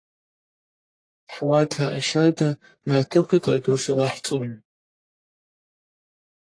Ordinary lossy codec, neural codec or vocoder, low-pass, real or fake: AAC, 48 kbps; codec, 44.1 kHz, 1.7 kbps, Pupu-Codec; 9.9 kHz; fake